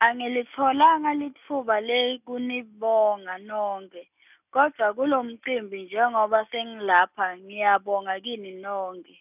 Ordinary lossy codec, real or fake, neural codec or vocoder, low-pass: none; real; none; 3.6 kHz